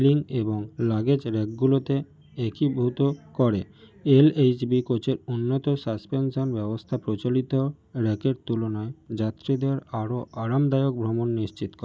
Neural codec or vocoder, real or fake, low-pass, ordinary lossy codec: none; real; none; none